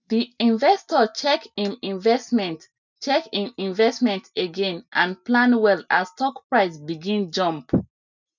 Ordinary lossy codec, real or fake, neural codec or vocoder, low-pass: none; real; none; 7.2 kHz